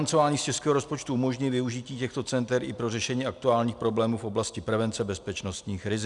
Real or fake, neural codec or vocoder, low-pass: real; none; 10.8 kHz